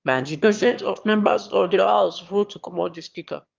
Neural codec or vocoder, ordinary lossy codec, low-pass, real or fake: autoencoder, 22.05 kHz, a latent of 192 numbers a frame, VITS, trained on one speaker; Opus, 24 kbps; 7.2 kHz; fake